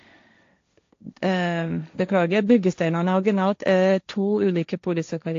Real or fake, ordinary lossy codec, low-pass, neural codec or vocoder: fake; AAC, 48 kbps; 7.2 kHz; codec, 16 kHz, 1.1 kbps, Voila-Tokenizer